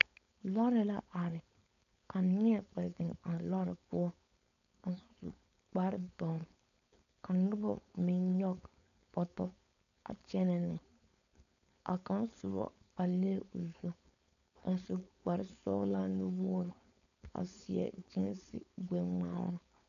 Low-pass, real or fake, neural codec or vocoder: 7.2 kHz; fake; codec, 16 kHz, 4.8 kbps, FACodec